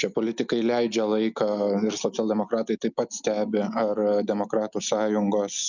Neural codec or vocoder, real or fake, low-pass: none; real; 7.2 kHz